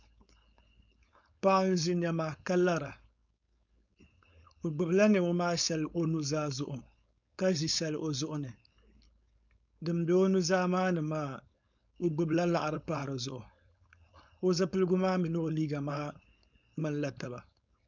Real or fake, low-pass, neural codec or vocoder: fake; 7.2 kHz; codec, 16 kHz, 4.8 kbps, FACodec